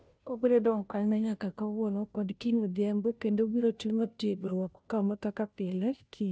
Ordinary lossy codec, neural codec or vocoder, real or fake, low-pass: none; codec, 16 kHz, 0.5 kbps, FunCodec, trained on Chinese and English, 25 frames a second; fake; none